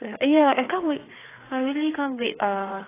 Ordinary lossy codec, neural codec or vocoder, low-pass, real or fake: AAC, 16 kbps; codec, 16 kHz, 4 kbps, FreqCodec, larger model; 3.6 kHz; fake